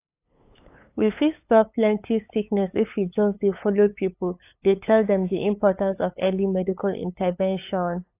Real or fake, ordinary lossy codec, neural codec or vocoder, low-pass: fake; AAC, 24 kbps; codec, 16 kHz, 8 kbps, FunCodec, trained on LibriTTS, 25 frames a second; 3.6 kHz